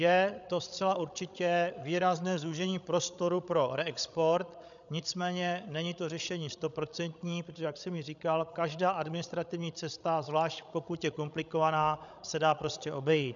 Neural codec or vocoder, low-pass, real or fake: codec, 16 kHz, 16 kbps, FreqCodec, larger model; 7.2 kHz; fake